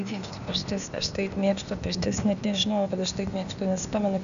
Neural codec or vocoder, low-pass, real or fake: codec, 16 kHz, 0.8 kbps, ZipCodec; 7.2 kHz; fake